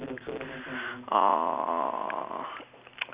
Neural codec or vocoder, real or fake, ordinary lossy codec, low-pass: none; real; Opus, 64 kbps; 3.6 kHz